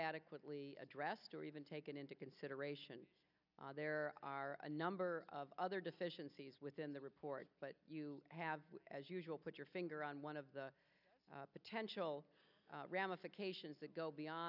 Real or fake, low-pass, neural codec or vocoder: real; 5.4 kHz; none